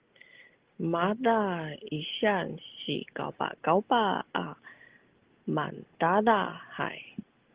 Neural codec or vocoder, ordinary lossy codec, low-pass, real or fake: none; Opus, 16 kbps; 3.6 kHz; real